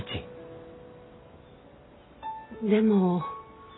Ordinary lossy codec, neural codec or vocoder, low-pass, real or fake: AAC, 16 kbps; none; 7.2 kHz; real